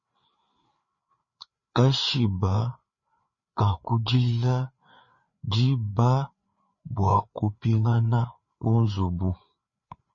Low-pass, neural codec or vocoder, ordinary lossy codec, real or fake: 7.2 kHz; codec, 16 kHz, 4 kbps, FreqCodec, larger model; MP3, 32 kbps; fake